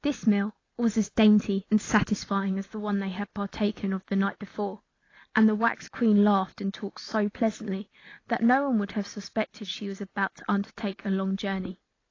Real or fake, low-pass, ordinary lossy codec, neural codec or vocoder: real; 7.2 kHz; AAC, 32 kbps; none